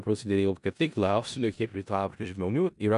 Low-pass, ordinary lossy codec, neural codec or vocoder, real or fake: 10.8 kHz; MP3, 96 kbps; codec, 16 kHz in and 24 kHz out, 0.4 kbps, LongCat-Audio-Codec, four codebook decoder; fake